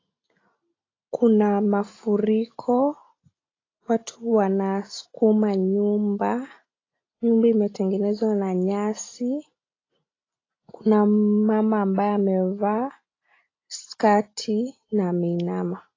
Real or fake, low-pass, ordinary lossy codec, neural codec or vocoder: real; 7.2 kHz; AAC, 32 kbps; none